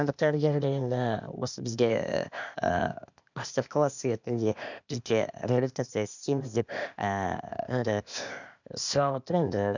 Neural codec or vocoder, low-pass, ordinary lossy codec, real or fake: codec, 16 kHz, 0.8 kbps, ZipCodec; 7.2 kHz; none; fake